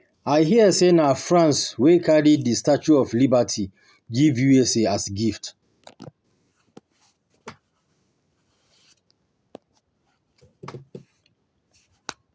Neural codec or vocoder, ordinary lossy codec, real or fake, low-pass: none; none; real; none